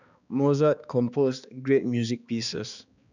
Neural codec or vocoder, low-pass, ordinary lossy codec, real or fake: codec, 16 kHz, 2 kbps, X-Codec, HuBERT features, trained on balanced general audio; 7.2 kHz; none; fake